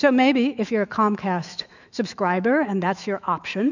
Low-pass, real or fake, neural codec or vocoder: 7.2 kHz; fake; autoencoder, 48 kHz, 128 numbers a frame, DAC-VAE, trained on Japanese speech